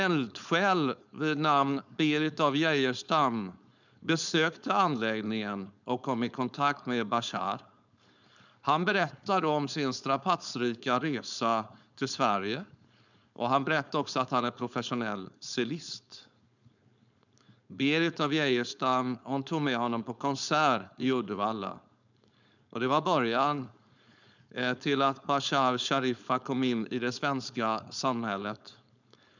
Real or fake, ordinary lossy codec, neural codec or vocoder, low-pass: fake; none; codec, 16 kHz, 4.8 kbps, FACodec; 7.2 kHz